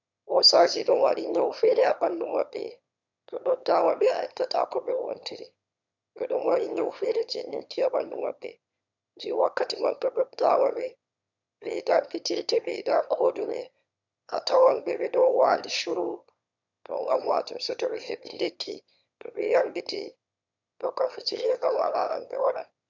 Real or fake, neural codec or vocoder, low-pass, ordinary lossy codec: fake; autoencoder, 22.05 kHz, a latent of 192 numbers a frame, VITS, trained on one speaker; 7.2 kHz; none